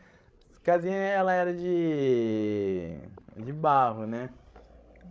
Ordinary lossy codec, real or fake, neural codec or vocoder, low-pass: none; fake; codec, 16 kHz, 16 kbps, FreqCodec, larger model; none